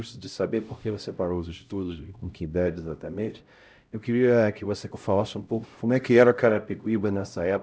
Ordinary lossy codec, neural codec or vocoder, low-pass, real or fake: none; codec, 16 kHz, 0.5 kbps, X-Codec, HuBERT features, trained on LibriSpeech; none; fake